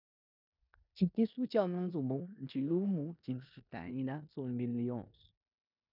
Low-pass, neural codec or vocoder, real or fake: 5.4 kHz; codec, 16 kHz in and 24 kHz out, 0.9 kbps, LongCat-Audio-Codec, four codebook decoder; fake